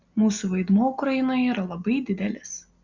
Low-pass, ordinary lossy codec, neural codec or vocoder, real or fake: 7.2 kHz; Opus, 64 kbps; none; real